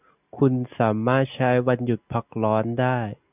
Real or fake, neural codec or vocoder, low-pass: real; none; 3.6 kHz